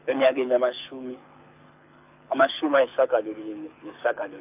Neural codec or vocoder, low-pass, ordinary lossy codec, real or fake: codec, 24 kHz, 6 kbps, HILCodec; 3.6 kHz; AAC, 32 kbps; fake